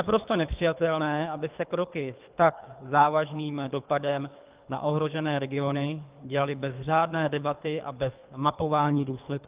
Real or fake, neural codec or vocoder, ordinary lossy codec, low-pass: fake; codec, 24 kHz, 3 kbps, HILCodec; Opus, 24 kbps; 3.6 kHz